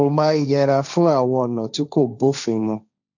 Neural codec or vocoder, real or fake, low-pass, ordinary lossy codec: codec, 16 kHz, 1.1 kbps, Voila-Tokenizer; fake; 7.2 kHz; none